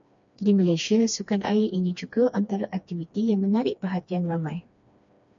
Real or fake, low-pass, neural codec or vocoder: fake; 7.2 kHz; codec, 16 kHz, 2 kbps, FreqCodec, smaller model